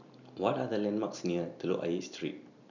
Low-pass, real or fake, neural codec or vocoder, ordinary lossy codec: 7.2 kHz; real; none; none